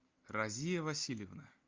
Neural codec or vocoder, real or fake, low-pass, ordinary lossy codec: none; real; 7.2 kHz; Opus, 24 kbps